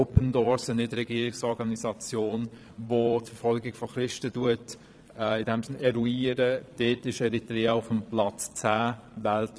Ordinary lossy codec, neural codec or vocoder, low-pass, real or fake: none; vocoder, 22.05 kHz, 80 mel bands, Vocos; none; fake